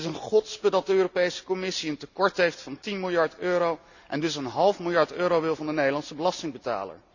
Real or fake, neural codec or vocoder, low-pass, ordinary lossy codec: real; none; 7.2 kHz; none